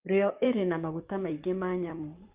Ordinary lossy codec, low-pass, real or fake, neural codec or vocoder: Opus, 24 kbps; 3.6 kHz; fake; vocoder, 44.1 kHz, 128 mel bands, Pupu-Vocoder